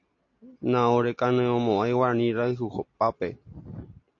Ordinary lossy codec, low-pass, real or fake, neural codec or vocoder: AAC, 48 kbps; 7.2 kHz; real; none